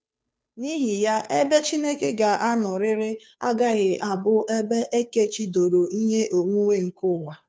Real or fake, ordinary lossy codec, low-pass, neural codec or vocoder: fake; none; none; codec, 16 kHz, 2 kbps, FunCodec, trained on Chinese and English, 25 frames a second